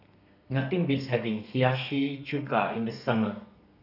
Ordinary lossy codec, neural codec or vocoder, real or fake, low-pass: none; codec, 32 kHz, 1.9 kbps, SNAC; fake; 5.4 kHz